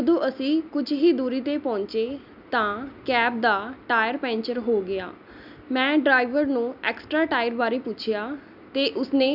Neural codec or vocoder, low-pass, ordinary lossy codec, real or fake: none; 5.4 kHz; none; real